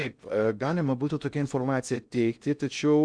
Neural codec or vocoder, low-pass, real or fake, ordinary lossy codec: codec, 16 kHz in and 24 kHz out, 0.6 kbps, FocalCodec, streaming, 2048 codes; 9.9 kHz; fake; Opus, 64 kbps